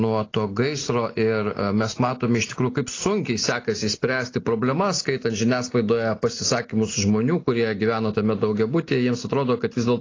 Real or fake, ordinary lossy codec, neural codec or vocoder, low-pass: real; AAC, 32 kbps; none; 7.2 kHz